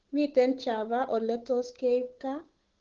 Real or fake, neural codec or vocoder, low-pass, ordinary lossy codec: fake; codec, 16 kHz, 8 kbps, FunCodec, trained on Chinese and English, 25 frames a second; 7.2 kHz; Opus, 16 kbps